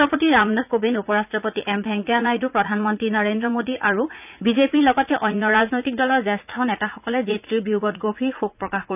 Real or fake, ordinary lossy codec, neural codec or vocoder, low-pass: fake; none; vocoder, 44.1 kHz, 80 mel bands, Vocos; 3.6 kHz